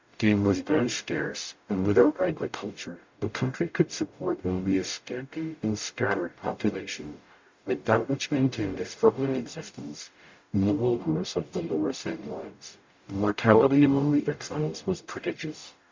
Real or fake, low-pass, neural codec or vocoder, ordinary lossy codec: fake; 7.2 kHz; codec, 44.1 kHz, 0.9 kbps, DAC; MP3, 64 kbps